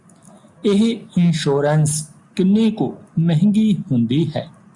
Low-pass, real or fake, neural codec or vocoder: 10.8 kHz; real; none